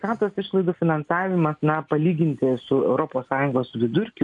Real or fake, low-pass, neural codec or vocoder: real; 10.8 kHz; none